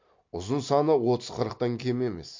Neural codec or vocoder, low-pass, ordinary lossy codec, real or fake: none; 7.2 kHz; MP3, 48 kbps; real